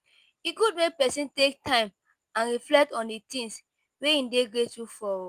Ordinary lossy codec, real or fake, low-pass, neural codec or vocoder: Opus, 32 kbps; real; 14.4 kHz; none